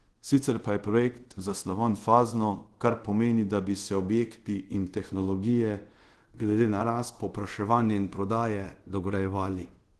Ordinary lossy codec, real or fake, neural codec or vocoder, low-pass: Opus, 16 kbps; fake; codec, 24 kHz, 0.5 kbps, DualCodec; 10.8 kHz